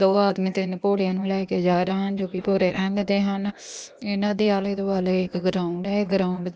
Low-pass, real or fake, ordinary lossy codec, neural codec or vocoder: none; fake; none; codec, 16 kHz, 0.8 kbps, ZipCodec